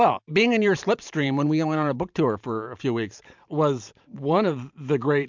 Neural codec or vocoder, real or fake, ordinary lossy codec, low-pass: vocoder, 44.1 kHz, 128 mel bands every 512 samples, BigVGAN v2; fake; MP3, 64 kbps; 7.2 kHz